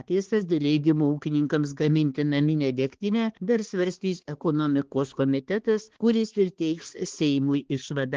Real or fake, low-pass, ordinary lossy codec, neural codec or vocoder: fake; 7.2 kHz; Opus, 16 kbps; codec, 16 kHz, 2 kbps, X-Codec, HuBERT features, trained on balanced general audio